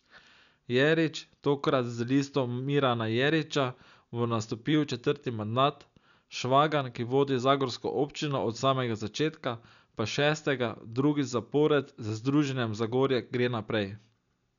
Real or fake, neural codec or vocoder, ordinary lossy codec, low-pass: real; none; none; 7.2 kHz